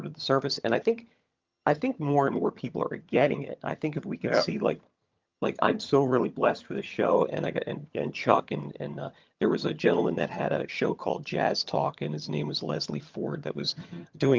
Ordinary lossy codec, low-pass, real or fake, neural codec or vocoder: Opus, 24 kbps; 7.2 kHz; fake; vocoder, 22.05 kHz, 80 mel bands, HiFi-GAN